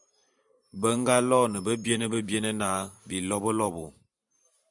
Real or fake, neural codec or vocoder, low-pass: fake; vocoder, 44.1 kHz, 128 mel bands every 512 samples, BigVGAN v2; 10.8 kHz